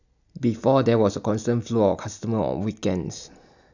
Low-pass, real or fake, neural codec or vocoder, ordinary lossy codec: 7.2 kHz; real; none; none